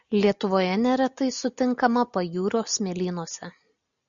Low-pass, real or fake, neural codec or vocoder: 7.2 kHz; real; none